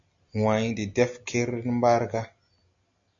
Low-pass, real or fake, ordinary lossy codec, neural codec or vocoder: 7.2 kHz; real; AAC, 64 kbps; none